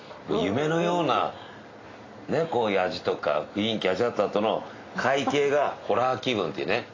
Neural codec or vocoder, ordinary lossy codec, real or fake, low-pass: none; AAC, 32 kbps; real; 7.2 kHz